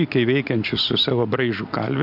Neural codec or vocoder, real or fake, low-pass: none; real; 5.4 kHz